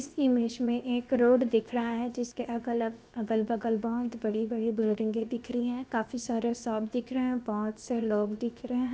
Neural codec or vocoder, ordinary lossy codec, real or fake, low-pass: codec, 16 kHz, 0.7 kbps, FocalCodec; none; fake; none